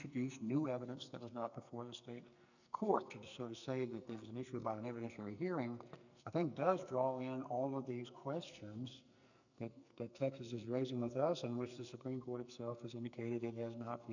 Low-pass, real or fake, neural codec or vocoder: 7.2 kHz; fake; codec, 44.1 kHz, 2.6 kbps, SNAC